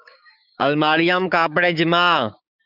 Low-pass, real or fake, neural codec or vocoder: 5.4 kHz; fake; codec, 16 kHz, 6 kbps, DAC